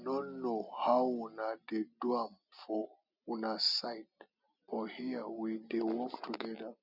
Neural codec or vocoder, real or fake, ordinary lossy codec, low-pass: none; real; none; 5.4 kHz